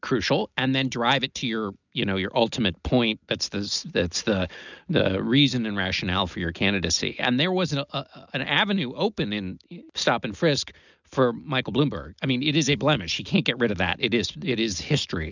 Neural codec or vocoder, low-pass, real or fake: none; 7.2 kHz; real